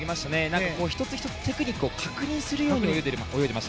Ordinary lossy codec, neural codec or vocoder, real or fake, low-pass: none; none; real; none